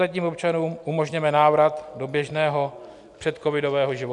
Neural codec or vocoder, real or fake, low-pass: none; real; 10.8 kHz